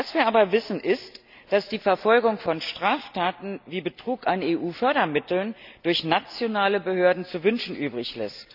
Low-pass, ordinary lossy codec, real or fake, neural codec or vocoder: 5.4 kHz; none; real; none